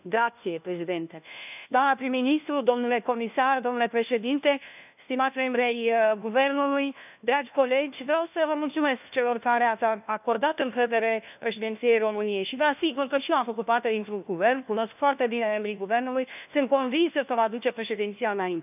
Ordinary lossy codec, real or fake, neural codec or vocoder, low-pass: none; fake; codec, 16 kHz, 1 kbps, FunCodec, trained on LibriTTS, 50 frames a second; 3.6 kHz